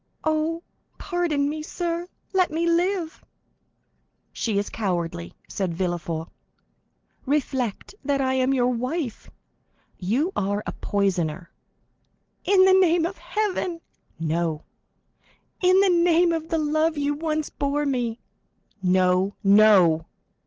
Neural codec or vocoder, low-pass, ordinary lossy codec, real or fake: none; 7.2 kHz; Opus, 16 kbps; real